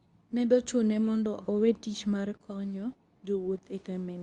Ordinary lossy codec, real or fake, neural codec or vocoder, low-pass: Opus, 64 kbps; fake; codec, 24 kHz, 0.9 kbps, WavTokenizer, medium speech release version 2; 10.8 kHz